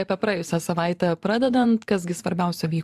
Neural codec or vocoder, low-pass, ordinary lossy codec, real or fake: vocoder, 44.1 kHz, 128 mel bands every 512 samples, BigVGAN v2; 14.4 kHz; AAC, 96 kbps; fake